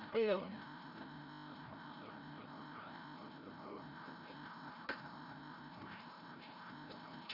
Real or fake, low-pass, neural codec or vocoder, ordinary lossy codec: fake; 5.4 kHz; codec, 16 kHz, 0.5 kbps, FreqCodec, larger model; none